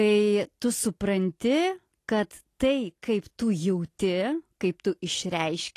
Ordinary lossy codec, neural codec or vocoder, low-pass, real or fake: AAC, 48 kbps; none; 14.4 kHz; real